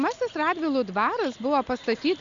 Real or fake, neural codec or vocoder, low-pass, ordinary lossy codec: real; none; 7.2 kHz; Opus, 64 kbps